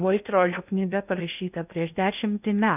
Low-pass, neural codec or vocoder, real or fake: 3.6 kHz; codec, 16 kHz in and 24 kHz out, 0.6 kbps, FocalCodec, streaming, 2048 codes; fake